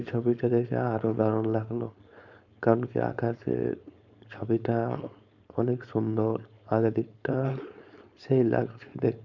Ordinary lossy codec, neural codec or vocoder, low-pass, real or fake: none; codec, 16 kHz, 4.8 kbps, FACodec; 7.2 kHz; fake